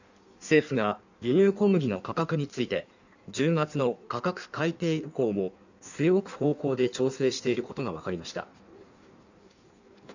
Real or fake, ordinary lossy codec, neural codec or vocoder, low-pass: fake; none; codec, 16 kHz in and 24 kHz out, 1.1 kbps, FireRedTTS-2 codec; 7.2 kHz